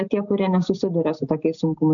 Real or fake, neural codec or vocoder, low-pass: real; none; 7.2 kHz